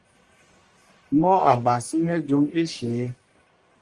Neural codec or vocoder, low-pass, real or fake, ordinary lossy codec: codec, 44.1 kHz, 1.7 kbps, Pupu-Codec; 10.8 kHz; fake; Opus, 32 kbps